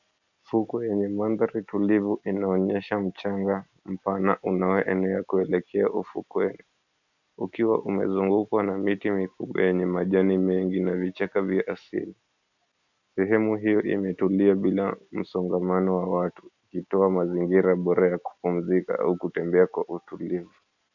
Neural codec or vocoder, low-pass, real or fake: none; 7.2 kHz; real